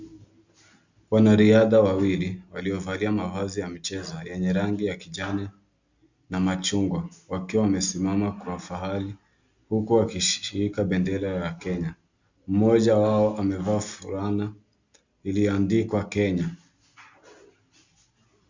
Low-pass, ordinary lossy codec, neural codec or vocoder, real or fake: 7.2 kHz; Opus, 64 kbps; none; real